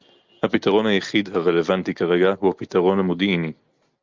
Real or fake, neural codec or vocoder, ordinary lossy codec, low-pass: real; none; Opus, 24 kbps; 7.2 kHz